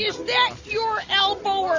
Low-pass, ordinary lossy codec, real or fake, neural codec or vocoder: 7.2 kHz; Opus, 64 kbps; real; none